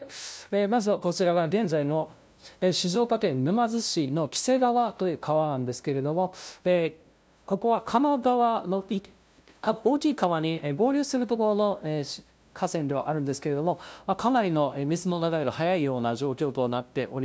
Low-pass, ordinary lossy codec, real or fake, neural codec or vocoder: none; none; fake; codec, 16 kHz, 0.5 kbps, FunCodec, trained on LibriTTS, 25 frames a second